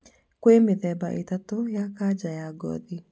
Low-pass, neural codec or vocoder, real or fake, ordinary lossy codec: none; none; real; none